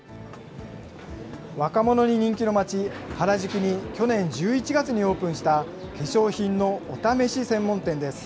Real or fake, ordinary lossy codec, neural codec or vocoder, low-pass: real; none; none; none